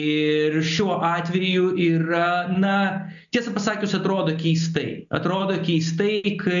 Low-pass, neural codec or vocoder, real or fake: 7.2 kHz; none; real